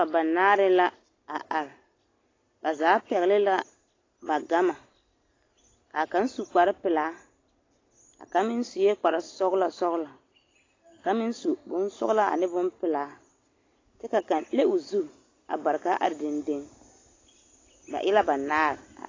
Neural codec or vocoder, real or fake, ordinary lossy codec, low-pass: none; real; AAC, 32 kbps; 7.2 kHz